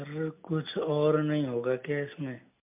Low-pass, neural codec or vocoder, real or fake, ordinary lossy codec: 3.6 kHz; none; real; MP3, 32 kbps